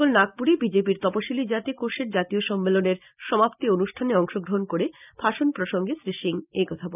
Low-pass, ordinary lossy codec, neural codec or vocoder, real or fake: 3.6 kHz; none; none; real